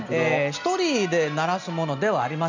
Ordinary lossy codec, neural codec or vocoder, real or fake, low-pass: none; none; real; 7.2 kHz